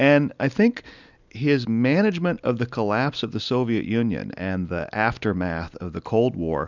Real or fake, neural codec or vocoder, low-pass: real; none; 7.2 kHz